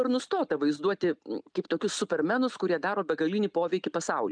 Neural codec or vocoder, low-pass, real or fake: none; 9.9 kHz; real